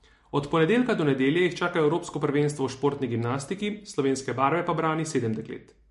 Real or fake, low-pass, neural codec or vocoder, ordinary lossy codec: real; 14.4 kHz; none; MP3, 48 kbps